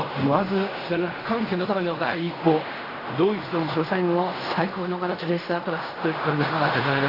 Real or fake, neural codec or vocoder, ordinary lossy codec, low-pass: fake; codec, 16 kHz in and 24 kHz out, 0.4 kbps, LongCat-Audio-Codec, fine tuned four codebook decoder; AAC, 24 kbps; 5.4 kHz